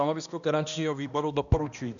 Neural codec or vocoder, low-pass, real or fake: codec, 16 kHz, 1 kbps, X-Codec, HuBERT features, trained on balanced general audio; 7.2 kHz; fake